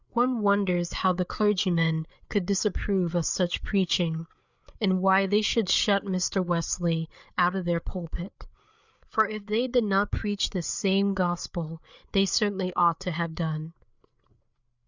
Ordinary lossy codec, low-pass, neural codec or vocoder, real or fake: Opus, 64 kbps; 7.2 kHz; codec, 16 kHz, 8 kbps, FreqCodec, larger model; fake